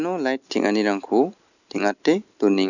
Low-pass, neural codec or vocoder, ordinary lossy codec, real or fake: 7.2 kHz; none; none; real